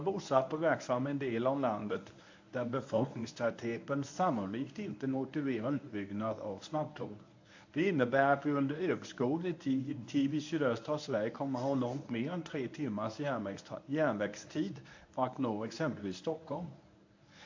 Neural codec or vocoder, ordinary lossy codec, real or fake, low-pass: codec, 24 kHz, 0.9 kbps, WavTokenizer, medium speech release version 1; AAC, 48 kbps; fake; 7.2 kHz